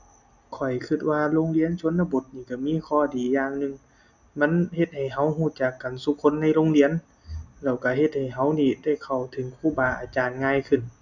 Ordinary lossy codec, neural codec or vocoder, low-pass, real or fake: none; none; 7.2 kHz; real